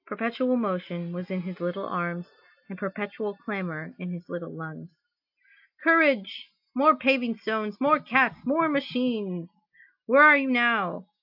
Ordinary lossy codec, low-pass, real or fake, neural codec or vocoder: AAC, 48 kbps; 5.4 kHz; real; none